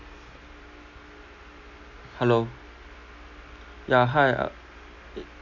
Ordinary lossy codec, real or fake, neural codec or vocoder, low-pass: none; real; none; 7.2 kHz